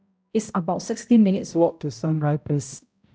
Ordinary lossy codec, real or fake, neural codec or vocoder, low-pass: none; fake; codec, 16 kHz, 0.5 kbps, X-Codec, HuBERT features, trained on balanced general audio; none